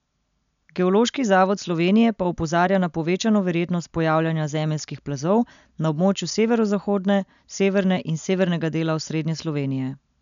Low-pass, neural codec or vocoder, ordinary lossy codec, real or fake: 7.2 kHz; none; none; real